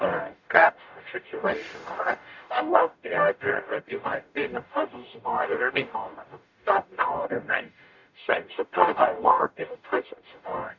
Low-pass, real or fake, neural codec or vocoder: 7.2 kHz; fake; codec, 44.1 kHz, 0.9 kbps, DAC